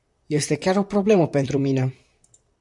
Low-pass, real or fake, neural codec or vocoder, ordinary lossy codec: 10.8 kHz; fake; vocoder, 44.1 kHz, 128 mel bands, Pupu-Vocoder; MP3, 64 kbps